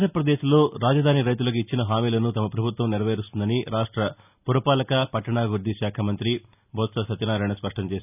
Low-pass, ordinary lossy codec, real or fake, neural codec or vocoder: 3.6 kHz; none; real; none